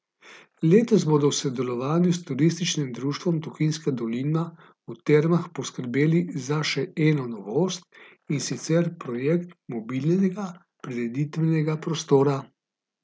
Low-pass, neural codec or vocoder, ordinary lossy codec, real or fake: none; none; none; real